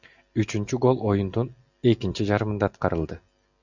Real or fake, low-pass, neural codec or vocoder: real; 7.2 kHz; none